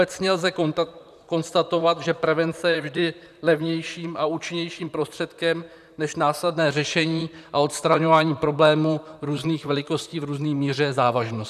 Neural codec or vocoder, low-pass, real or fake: vocoder, 44.1 kHz, 128 mel bands, Pupu-Vocoder; 14.4 kHz; fake